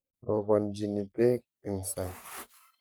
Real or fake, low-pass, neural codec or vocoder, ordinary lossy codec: fake; none; codec, 44.1 kHz, 3.4 kbps, Pupu-Codec; none